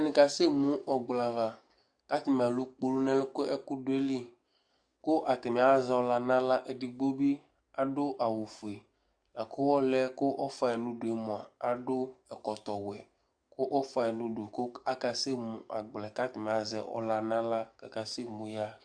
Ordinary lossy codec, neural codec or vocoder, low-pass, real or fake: Opus, 64 kbps; codec, 44.1 kHz, 7.8 kbps, DAC; 9.9 kHz; fake